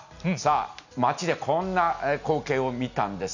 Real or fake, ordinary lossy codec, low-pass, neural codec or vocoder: real; none; 7.2 kHz; none